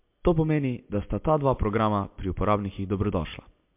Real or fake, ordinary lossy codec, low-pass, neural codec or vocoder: real; MP3, 32 kbps; 3.6 kHz; none